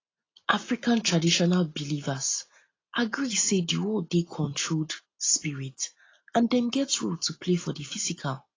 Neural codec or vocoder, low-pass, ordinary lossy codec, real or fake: none; 7.2 kHz; AAC, 32 kbps; real